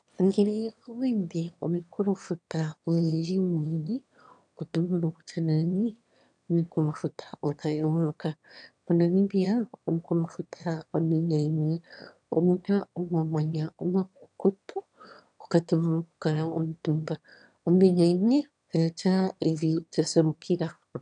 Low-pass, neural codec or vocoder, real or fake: 9.9 kHz; autoencoder, 22.05 kHz, a latent of 192 numbers a frame, VITS, trained on one speaker; fake